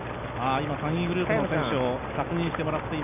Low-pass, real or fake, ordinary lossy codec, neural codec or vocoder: 3.6 kHz; real; none; none